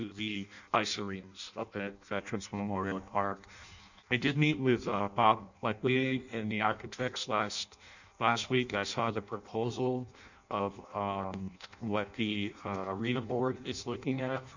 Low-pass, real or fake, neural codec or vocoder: 7.2 kHz; fake; codec, 16 kHz in and 24 kHz out, 0.6 kbps, FireRedTTS-2 codec